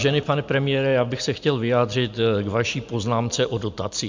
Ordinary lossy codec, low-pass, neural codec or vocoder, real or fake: MP3, 64 kbps; 7.2 kHz; none; real